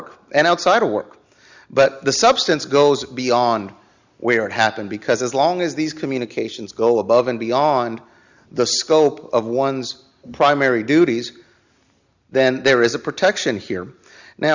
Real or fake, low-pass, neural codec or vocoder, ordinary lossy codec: real; 7.2 kHz; none; Opus, 64 kbps